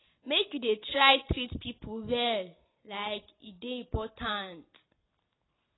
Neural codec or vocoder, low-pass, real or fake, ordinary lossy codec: none; 7.2 kHz; real; AAC, 16 kbps